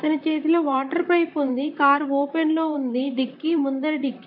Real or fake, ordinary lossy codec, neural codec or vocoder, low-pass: fake; none; vocoder, 44.1 kHz, 128 mel bands, Pupu-Vocoder; 5.4 kHz